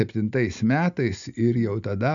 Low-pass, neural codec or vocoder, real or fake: 7.2 kHz; none; real